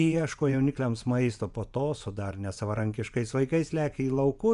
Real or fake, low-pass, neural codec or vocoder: fake; 10.8 kHz; vocoder, 24 kHz, 100 mel bands, Vocos